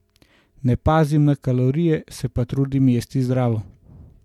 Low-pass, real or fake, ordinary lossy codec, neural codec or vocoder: 19.8 kHz; real; MP3, 96 kbps; none